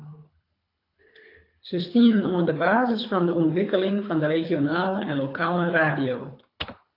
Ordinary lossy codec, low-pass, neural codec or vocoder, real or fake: AAC, 32 kbps; 5.4 kHz; codec, 24 kHz, 3 kbps, HILCodec; fake